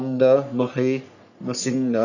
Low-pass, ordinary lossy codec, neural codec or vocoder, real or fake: 7.2 kHz; none; codec, 44.1 kHz, 3.4 kbps, Pupu-Codec; fake